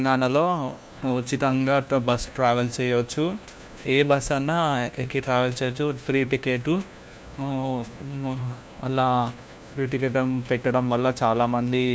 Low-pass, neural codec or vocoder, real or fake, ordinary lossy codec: none; codec, 16 kHz, 1 kbps, FunCodec, trained on LibriTTS, 50 frames a second; fake; none